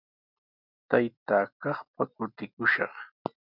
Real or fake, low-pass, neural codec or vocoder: real; 5.4 kHz; none